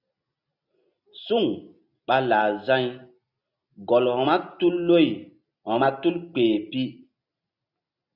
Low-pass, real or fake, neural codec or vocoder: 5.4 kHz; real; none